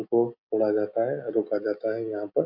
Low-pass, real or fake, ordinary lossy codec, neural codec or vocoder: 5.4 kHz; real; MP3, 48 kbps; none